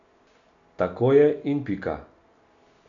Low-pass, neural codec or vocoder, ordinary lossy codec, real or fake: 7.2 kHz; none; none; real